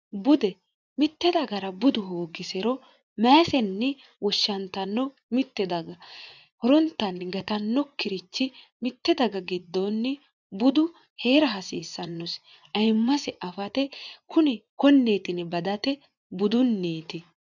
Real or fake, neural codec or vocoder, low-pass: fake; vocoder, 24 kHz, 100 mel bands, Vocos; 7.2 kHz